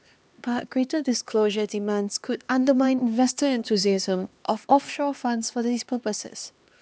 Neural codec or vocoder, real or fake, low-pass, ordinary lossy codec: codec, 16 kHz, 2 kbps, X-Codec, HuBERT features, trained on LibriSpeech; fake; none; none